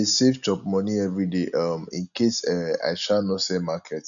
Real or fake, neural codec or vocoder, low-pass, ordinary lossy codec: real; none; 7.2 kHz; none